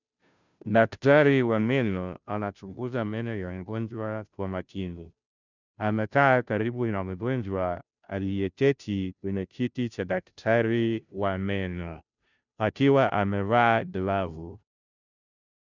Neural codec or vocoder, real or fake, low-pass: codec, 16 kHz, 0.5 kbps, FunCodec, trained on Chinese and English, 25 frames a second; fake; 7.2 kHz